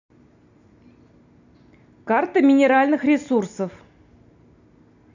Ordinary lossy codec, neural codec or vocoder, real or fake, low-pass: none; none; real; 7.2 kHz